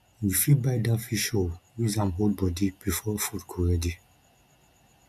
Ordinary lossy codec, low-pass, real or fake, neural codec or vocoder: none; 14.4 kHz; real; none